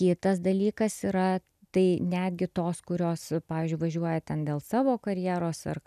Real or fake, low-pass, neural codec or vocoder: real; 14.4 kHz; none